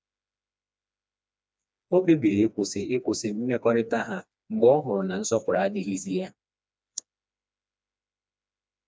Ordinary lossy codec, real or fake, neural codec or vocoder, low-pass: none; fake; codec, 16 kHz, 2 kbps, FreqCodec, smaller model; none